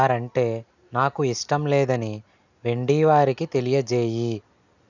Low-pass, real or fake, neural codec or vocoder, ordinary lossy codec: 7.2 kHz; real; none; none